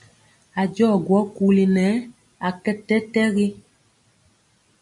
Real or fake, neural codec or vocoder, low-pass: real; none; 10.8 kHz